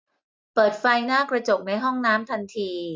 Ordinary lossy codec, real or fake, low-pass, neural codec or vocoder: none; real; none; none